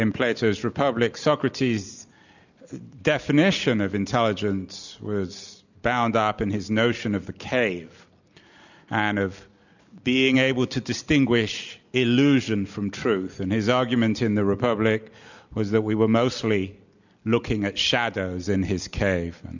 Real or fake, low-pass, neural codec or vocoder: real; 7.2 kHz; none